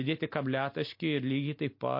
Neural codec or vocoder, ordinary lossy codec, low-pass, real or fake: none; MP3, 32 kbps; 5.4 kHz; real